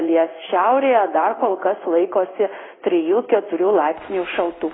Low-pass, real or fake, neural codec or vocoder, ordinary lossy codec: 7.2 kHz; fake; codec, 16 kHz in and 24 kHz out, 1 kbps, XY-Tokenizer; AAC, 16 kbps